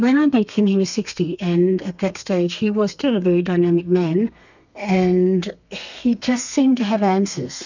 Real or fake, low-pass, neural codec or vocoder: fake; 7.2 kHz; codec, 32 kHz, 1.9 kbps, SNAC